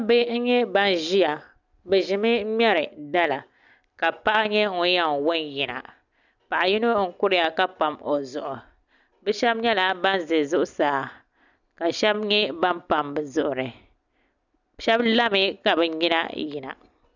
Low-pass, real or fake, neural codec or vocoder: 7.2 kHz; real; none